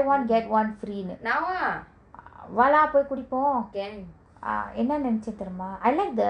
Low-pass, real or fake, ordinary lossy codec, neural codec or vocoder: 9.9 kHz; real; none; none